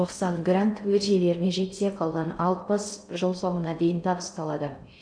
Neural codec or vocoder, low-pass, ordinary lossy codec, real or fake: codec, 16 kHz in and 24 kHz out, 0.6 kbps, FocalCodec, streaming, 4096 codes; 9.9 kHz; none; fake